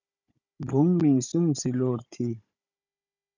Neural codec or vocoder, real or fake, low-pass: codec, 16 kHz, 16 kbps, FunCodec, trained on Chinese and English, 50 frames a second; fake; 7.2 kHz